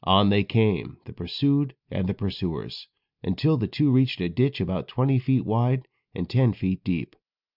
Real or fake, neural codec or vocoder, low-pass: real; none; 5.4 kHz